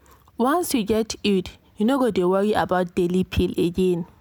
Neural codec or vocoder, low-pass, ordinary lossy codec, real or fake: none; none; none; real